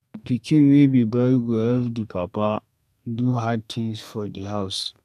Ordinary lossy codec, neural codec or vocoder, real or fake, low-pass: none; codec, 32 kHz, 1.9 kbps, SNAC; fake; 14.4 kHz